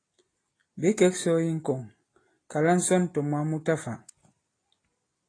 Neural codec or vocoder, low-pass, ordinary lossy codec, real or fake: none; 9.9 kHz; AAC, 32 kbps; real